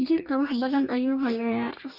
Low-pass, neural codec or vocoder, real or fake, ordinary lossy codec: 5.4 kHz; codec, 44.1 kHz, 2.6 kbps, DAC; fake; none